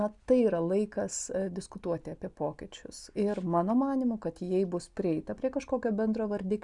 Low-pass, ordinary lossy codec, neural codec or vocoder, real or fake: 10.8 kHz; Opus, 64 kbps; none; real